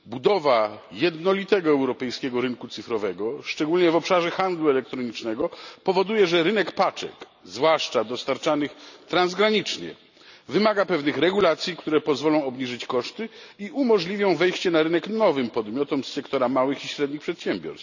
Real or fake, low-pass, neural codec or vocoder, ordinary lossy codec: real; 7.2 kHz; none; none